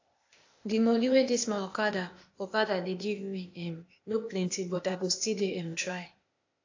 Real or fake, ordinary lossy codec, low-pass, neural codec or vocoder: fake; AAC, 48 kbps; 7.2 kHz; codec, 16 kHz, 0.8 kbps, ZipCodec